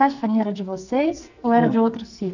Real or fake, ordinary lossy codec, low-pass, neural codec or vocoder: fake; none; 7.2 kHz; codec, 44.1 kHz, 2.6 kbps, SNAC